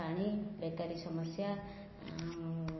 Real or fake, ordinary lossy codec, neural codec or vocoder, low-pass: real; MP3, 24 kbps; none; 7.2 kHz